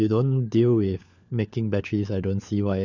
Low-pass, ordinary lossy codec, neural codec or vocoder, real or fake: 7.2 kHz; none; codec, 16 kHz, 4 kbps, FunCodec, trained on LibriTTS, 50 frames a second; fake